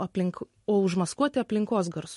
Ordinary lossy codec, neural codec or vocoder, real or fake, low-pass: MP3, 48 kbps; none; real; 14.4 kHz